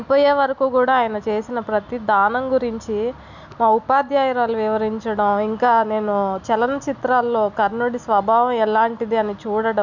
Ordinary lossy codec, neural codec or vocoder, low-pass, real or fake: none; none; 7.2 kHz; real